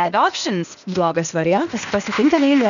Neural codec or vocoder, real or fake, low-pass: codec, 16 kHz, 0.8 kbps, ZipCodec; fake; 7.2 kHz